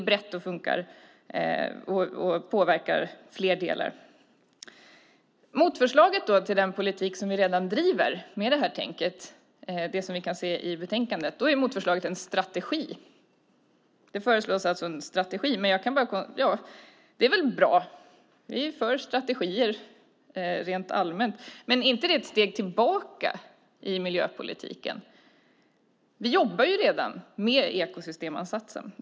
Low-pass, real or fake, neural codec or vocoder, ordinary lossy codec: none; real; none; none